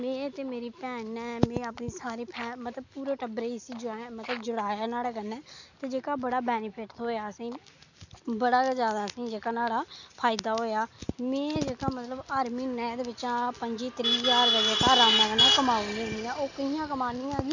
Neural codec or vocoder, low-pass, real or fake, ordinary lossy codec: none; 7.2 kHz; real; none